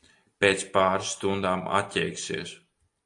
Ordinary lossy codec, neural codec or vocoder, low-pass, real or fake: AAC, 64 kbps; none; 10.8 kHz; real